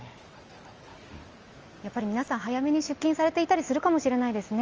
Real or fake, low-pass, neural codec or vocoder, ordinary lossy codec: real; 7.2 kHz; none; Opus, 24 kbps